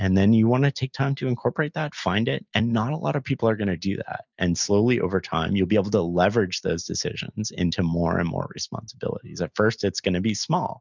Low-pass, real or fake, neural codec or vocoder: 7.2 kHz; real; none